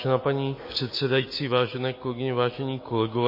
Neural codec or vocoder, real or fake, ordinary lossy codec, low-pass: autoencoder, 48 kHz, 128 numbers a frame, DAC-VAE, trained on Japanese speech; fake; MP3, 24 kbps; 5.4 kHz